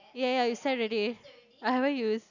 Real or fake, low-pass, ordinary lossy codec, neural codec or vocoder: real; 7.2 kHz; none; none